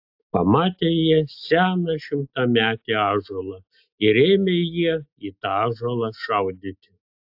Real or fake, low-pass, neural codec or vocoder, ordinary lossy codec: real; 5.4 kHz; none; AAC, 48 kbps